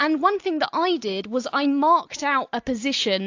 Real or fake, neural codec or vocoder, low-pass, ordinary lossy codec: real; none; 7.2 kHz; AAC, 48 kbps